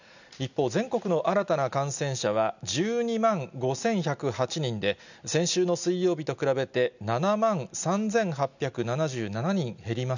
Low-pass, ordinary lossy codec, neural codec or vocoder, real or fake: 7.2 kHz; none; none; real